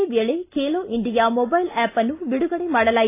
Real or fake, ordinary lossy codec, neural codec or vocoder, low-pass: real; AAC, 24 kbps; none; 3.6 kHz